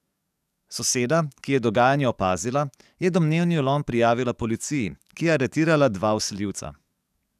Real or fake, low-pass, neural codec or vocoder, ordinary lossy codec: fake; 14.4 kHz; codec, 44.1 kHz, 7.8 kbps, DAC; none